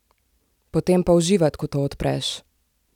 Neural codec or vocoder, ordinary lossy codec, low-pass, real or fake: none; none; 19.8 kHz; real